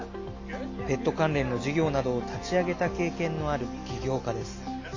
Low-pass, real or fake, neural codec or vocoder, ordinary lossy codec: 7.2 kHz; real; none; AAC, 48 kbps